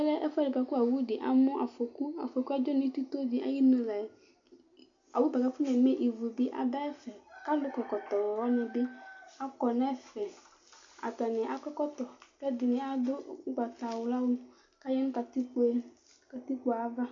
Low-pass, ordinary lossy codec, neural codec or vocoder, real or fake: 7.2 kHz; AAC, 64 kbps; none; real